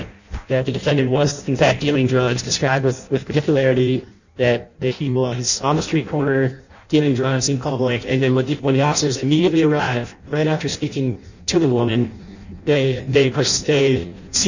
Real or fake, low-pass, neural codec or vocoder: fake; 7.2 kHz; codec, 16 kHz in and 24 kHz out, 0.6 kbps, FireRedTTS-2 codec